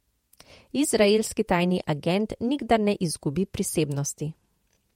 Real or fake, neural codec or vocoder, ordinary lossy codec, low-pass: fake; vocoder, 48 kHz, 128 mel bands, Vocos; MP3, 64 kbps; 19.8 kHz